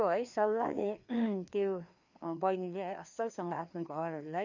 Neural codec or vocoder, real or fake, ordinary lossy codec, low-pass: codec, 16 kHz, 2 kbps, FreqCodec, larger model; fake; none; 7.2 kHz